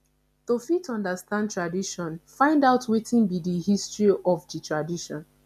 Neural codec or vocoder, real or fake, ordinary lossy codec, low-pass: none; real; none; 14.4 kHz